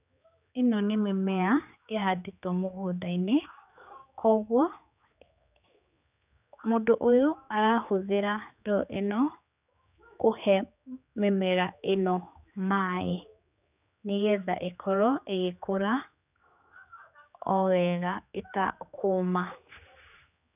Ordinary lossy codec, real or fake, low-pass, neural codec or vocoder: none; fake; 3.6 kHz; codec, 16 kHz, 4 kbps, X-Codec, HuBERT features, trained on general audio